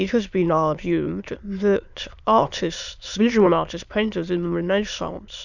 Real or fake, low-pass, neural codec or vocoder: fake; 7.2 kHz; autoencoder, 22.05 kHz, a latent of 192 numbers a frame, VITS, trained on many speakers